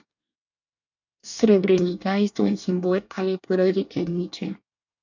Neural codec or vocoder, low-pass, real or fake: codec, 24 kHz, 1 kbps, SNAC; 7.2 kHz; fake